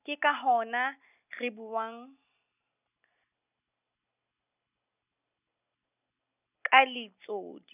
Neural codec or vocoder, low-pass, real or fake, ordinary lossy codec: none; 3.6 kHz; real; none